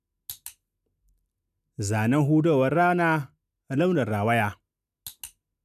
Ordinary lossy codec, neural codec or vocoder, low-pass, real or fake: none; none; 14.4 kHz; real